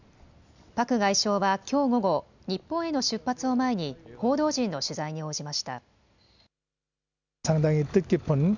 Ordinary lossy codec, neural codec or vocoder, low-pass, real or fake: none; none; 7.2 kHz; real